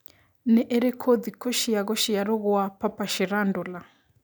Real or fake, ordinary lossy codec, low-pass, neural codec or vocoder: real; none; none; none